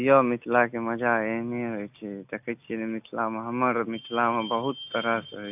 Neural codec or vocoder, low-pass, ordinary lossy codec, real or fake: none; 3.6 kHz; AAC, 32 kbps; real